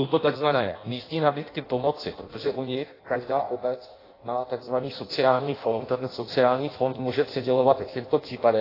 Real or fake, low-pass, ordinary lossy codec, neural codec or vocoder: fake; 5.4 kHz; AAC, 24 kbps; codec, 16 kHz in and 24 kHz out, 0.6 kbps, FireRedTTS-2 codec